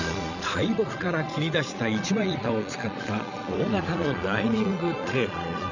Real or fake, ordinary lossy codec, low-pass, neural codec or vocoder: fake; none; 7.2 kHz; vocoder, 22.05 kHz, 80 mel bands, Vocos